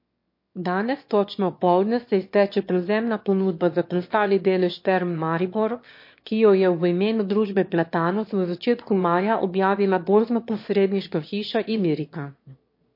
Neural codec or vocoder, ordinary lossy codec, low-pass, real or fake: autoencoder, 22.05 kHz, a latent of 192 numbers a frame, VITS, trained on one speaker; MP3, 32 kbps; 5.4 kHz; fake